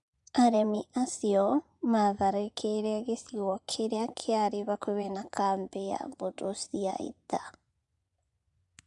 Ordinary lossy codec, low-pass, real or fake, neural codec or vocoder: none; 10.8 kHz; fake; vocoder, 24 kHz, 100 mel bands, Vocos